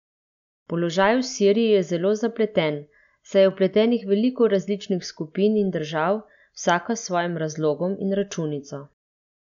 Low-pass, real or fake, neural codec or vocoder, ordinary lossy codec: 7.2 kHz; real; none; none